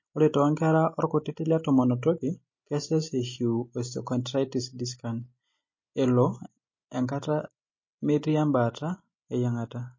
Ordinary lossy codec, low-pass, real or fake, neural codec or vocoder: MP3, 32 kbps; 7.2 kHz; real; none